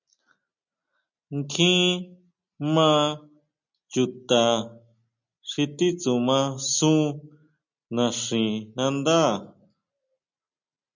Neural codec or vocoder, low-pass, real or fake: none; 7.2 kHz; real